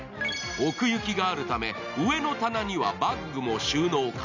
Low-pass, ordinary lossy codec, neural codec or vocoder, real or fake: 7.2 kHz; none; none; real